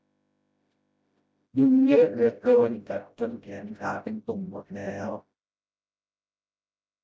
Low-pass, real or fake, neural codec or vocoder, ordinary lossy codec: none; fake; codec, 16 kHz, 0.5 kbps, FreqCodec, smaller model; none